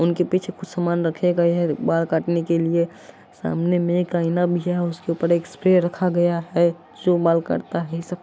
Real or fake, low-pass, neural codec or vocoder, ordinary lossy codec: real; none; none; none